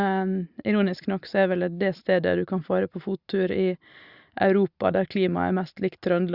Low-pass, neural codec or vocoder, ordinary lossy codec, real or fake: 5.4 kHz; none; Opus, 64 kbps; real